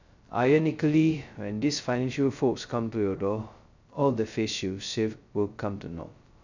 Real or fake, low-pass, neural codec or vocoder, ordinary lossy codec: fake; 7.2 kHz; codec, 16 kHz, 0.2 kbps, FocalCodec; none